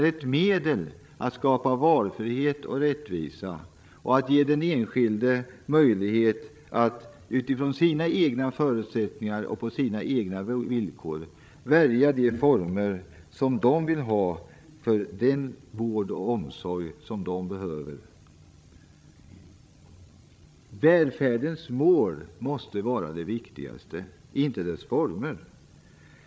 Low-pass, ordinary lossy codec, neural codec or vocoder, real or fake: none; none; codec, 16 kHz, 16 kbps, FreqCodec, larger model; fake